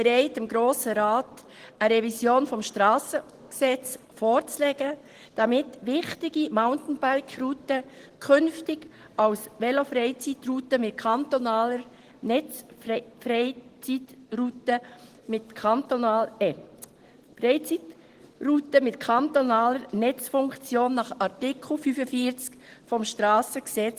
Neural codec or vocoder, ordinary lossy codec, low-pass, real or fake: none; Opus, 16 kbps; 14.4 kHz; real